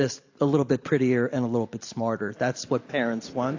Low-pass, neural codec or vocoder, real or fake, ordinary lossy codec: 7.2 kHz; none; real; AAC, 48 kbps